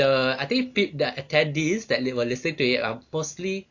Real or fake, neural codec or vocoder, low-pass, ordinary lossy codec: real; none; 7.2 kHz; AAC, 48 kbps